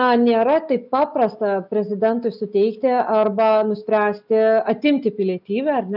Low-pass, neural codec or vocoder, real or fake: 5.4 kHz; none; real